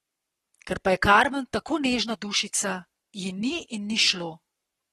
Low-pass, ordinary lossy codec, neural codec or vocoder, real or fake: 19.8 kHz; AAC, 32 kbps; codec, 44.1 kHz, 7.8 kbps, Pupu-Codec; fake